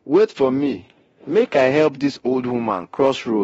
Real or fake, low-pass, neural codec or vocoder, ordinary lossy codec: fake; 10.8 kHz; codec, 24 kHz, 0.9 kbps, DualCodec; AAC, 24 kbps